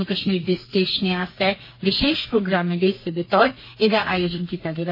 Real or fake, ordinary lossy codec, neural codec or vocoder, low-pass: fake; MP3, 24 kbps; codec, 32 kHz, 1.9 kbps, SNAC; 5.4 kHz